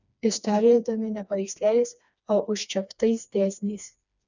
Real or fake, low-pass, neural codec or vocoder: fake; 7.2 kHz; codec, 16 kHz, 2 kbps, FreqCodec, smaller model